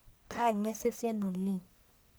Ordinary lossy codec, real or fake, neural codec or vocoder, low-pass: none; fake; codec, 44.1 kHz, 1.7 kbps, Pupu-Codec; none